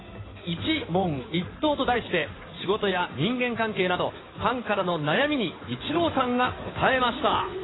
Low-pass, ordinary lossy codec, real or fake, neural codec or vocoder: 7.2 kHz; AAC, 16 kbps; fake; codec, 16 kHz in and 24 kHz out, 2.2 kbps, FireRedTTS-2 codec